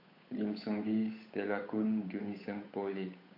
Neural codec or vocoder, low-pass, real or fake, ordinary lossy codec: codec, 16 kHz, 8 kbps, FunCodec, trained on Chinese and English, 25 frames a second; 5.4 kHz; fake; AAC, 32 kbps